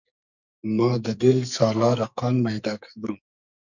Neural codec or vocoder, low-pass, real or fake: codec, 44.1 kHz, 2.6 kbps, SNAC; 7.2 kHz; fake